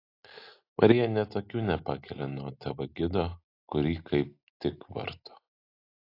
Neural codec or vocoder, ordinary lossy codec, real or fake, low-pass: none; AAC, 32 kbps; real; 5.4 kHz